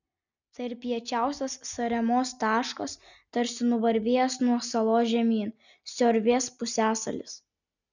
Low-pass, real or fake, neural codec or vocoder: 7.2 kHz; real; none